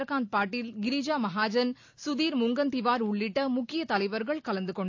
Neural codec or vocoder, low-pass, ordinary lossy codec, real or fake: none; 7.2 kHz; AAC, 48 kbps; real